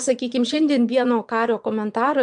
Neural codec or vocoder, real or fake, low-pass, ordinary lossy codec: vocoder, 22.05 kHz, 80 mel bands, Vocos; fake; 9.9 kHz; MP3, 64 kbps